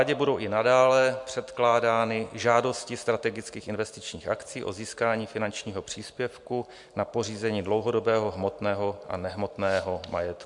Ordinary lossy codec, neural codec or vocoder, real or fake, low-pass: MP3, 64 kbps; none; real; 10.8 kHz